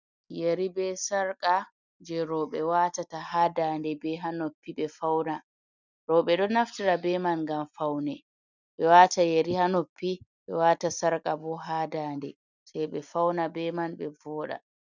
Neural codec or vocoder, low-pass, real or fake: none; 7.2 kHz; real